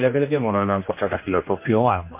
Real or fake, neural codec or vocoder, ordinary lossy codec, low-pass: fake; codec, 16 kHz, 1 kbps, X-Codec, HuBERT features, trained on general audio; MP3, 24 kbps; 3.6 kHz